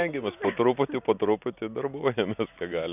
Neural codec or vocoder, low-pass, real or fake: none; 3.6 kHz; real